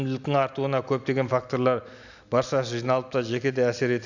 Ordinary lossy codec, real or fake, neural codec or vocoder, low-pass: none; real; none; 7.2 kHz